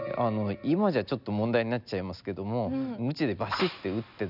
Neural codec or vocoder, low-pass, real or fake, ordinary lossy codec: none; 5.4 kHz; real; none